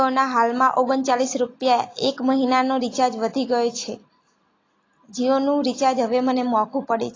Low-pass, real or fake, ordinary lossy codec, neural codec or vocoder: 7.2 kHz; real; AAC, 32 kbps; none